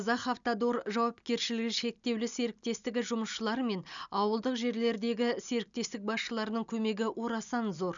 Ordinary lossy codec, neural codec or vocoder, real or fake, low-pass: none; none; real; 7.2 kHz